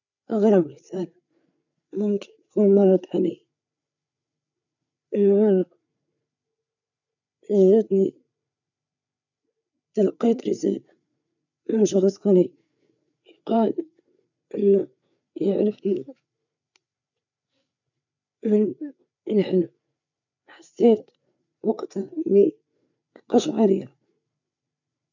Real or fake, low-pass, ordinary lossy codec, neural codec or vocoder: fake; 7.2 kHz; none; codec, 16 kHz, 8 kbps, FreqCodec, larger model